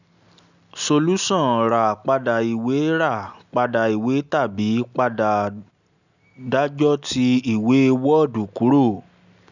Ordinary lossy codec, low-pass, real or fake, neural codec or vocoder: none; 7.2 kHz; real; none